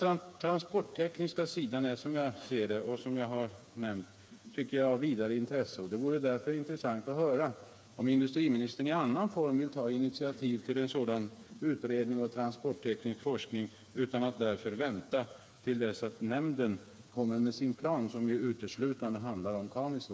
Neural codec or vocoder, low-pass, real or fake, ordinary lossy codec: codec, 16 kHz, 4 kbps, FreqCodec, smaller model; none; fake; none